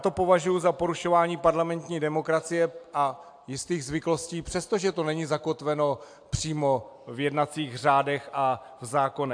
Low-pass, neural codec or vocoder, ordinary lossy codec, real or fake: 9.9 kHz; none; AAC, 64 kbps; real